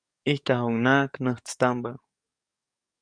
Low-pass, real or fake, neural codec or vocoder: 9.9 kHz; fake; codec, 44.1 kHz, 7.8 kbps, DAC